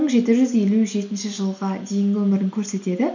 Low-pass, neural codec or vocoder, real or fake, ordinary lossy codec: 7.2 kHz; none; real; none